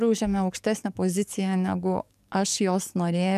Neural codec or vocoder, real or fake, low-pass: codec, 44.1 kHz, 7.8 kbps, DAC; fake; 14.4 kHz